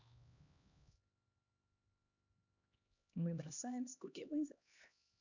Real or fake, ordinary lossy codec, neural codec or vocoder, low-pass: fake; none; codec, 16 kHz, 2 kbps, X-Codec, HuBERT features, trained on LibriSpeech; 7.2 kHz